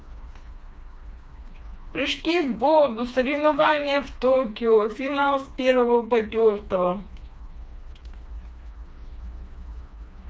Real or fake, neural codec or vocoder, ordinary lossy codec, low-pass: fake; codec, 16 kHz, 2 kbps, FreqCodec, smaller model; none; none